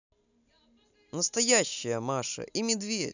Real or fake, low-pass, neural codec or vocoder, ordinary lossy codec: real; 7.2 kHz; none; none